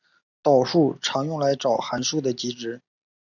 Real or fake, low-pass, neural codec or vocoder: real; 7.2 kHz; none